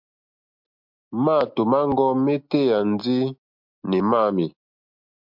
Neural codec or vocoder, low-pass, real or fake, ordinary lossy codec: none; 5.4 kHz; real; MP3, 48 kbps